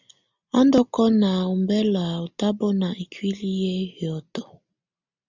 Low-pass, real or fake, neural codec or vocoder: 7.2 kHz; real; none